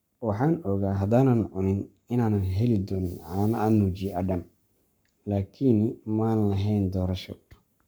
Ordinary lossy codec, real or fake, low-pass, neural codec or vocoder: none; fake; none; codec, 44.1 kHz, 7.8 kbps, Pupu-Codec